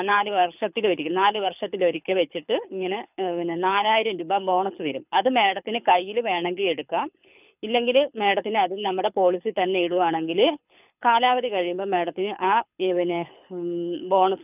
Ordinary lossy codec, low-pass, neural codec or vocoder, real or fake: none; 3.6 kHz; codec, 24 kHz, 6 kbps, HILCodec; fake